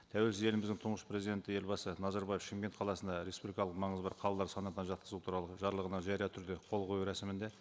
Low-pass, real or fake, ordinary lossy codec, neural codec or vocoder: none; real; none; none